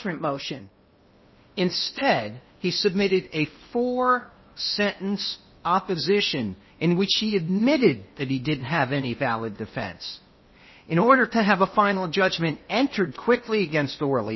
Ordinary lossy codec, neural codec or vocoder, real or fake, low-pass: MP3, 24 kbps; codec, 16 kHz in and 24 kHz out, 0.8 kbps, FocalCodec, streaming, 65536 codes; fake; 7.2 kHz